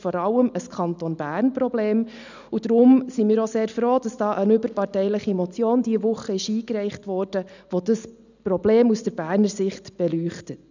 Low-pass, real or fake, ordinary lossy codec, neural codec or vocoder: 7.2 kHz; real; none; none